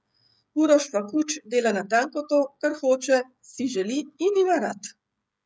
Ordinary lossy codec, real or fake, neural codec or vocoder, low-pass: none; fake; codec, 16 kHz, 16 kbps, FreqCodec, smaller model; none